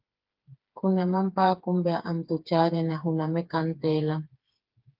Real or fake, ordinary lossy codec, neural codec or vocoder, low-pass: fake; Opus, 32 kbps; codec, 16 kHz, 4 kbps, FreqCodec, smaller model; 5.4 kHz